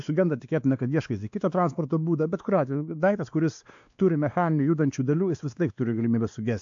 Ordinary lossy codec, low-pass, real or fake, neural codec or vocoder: AAC, 64 kbps; 7.2 kHz; fake; codec, 16 kHz, 2 kbps, X-Codec, WavLM features, trained on Multilingual LibriSpeech